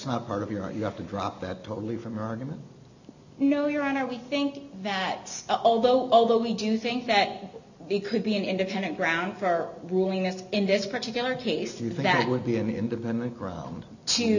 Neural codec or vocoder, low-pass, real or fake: none; 7.2 kHz; real